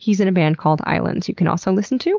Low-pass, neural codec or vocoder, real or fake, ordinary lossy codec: 7.2 kHz; none; real; Opus, 24 kbps